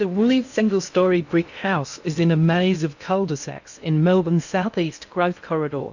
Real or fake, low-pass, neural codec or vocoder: fake; 7.2 kHz; codec, 16 kHz in and 24 kHz out, 0.6 kbps, FocalCodec, streaming, 2048 codes